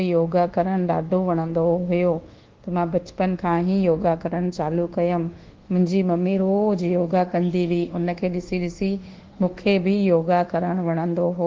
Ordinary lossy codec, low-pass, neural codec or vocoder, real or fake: Opus, 16 kbps; 7.2 kHz; codec, 24 kHz, 1.2 kbps, DualCodec; fake